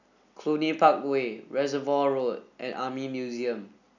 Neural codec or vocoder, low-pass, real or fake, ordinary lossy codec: none; 7.2 kHz; real; none